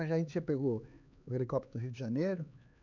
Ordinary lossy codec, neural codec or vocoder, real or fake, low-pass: none; codec, 16 kHz, 4 kbps, X-Codec, HuBERT features, trained on LibriSpeech; fake; 7.2 kHz